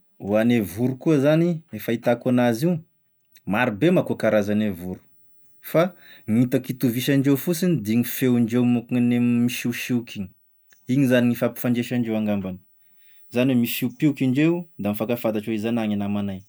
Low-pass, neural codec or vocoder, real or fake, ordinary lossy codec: none; none; real; none